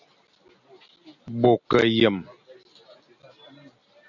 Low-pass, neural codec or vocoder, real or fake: 7.2 kHz; none; real